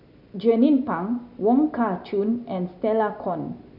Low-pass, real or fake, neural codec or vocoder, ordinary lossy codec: 5.4 kHz; real; none; none